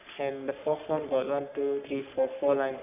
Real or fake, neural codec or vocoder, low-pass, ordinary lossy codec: fake; codec, 44.1 kHz, 3.4 kbps, Pupu-Codec; 3.6 kHz; none